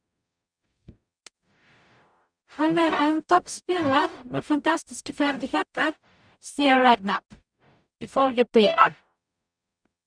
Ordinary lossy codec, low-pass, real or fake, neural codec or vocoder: none; 9.9 kHz; fake; codec, 44.1 kHz, 0.9 kbps, DAC